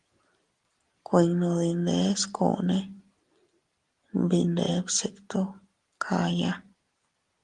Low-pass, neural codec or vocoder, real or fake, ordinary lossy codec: 10.8 kHz; none; real; Opus, 24 kbps